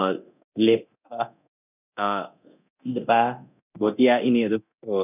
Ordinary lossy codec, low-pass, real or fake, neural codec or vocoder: none; 3.6 kHz; fake; codec, 24 kHz, 0.9 kbps, DualCodec